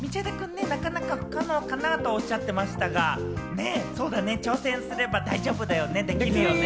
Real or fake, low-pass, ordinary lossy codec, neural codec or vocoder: real; none; none; none